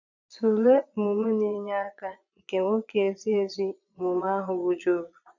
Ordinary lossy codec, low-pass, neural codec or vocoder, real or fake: none; 7.2 kHz; vocoder, 22.05 kHz, 80 mel bands, Vocos; fake